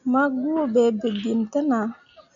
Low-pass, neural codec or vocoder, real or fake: 7.2 kHz; none; real